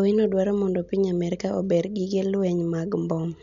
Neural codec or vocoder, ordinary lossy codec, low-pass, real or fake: none; none; 7.2 kHz; real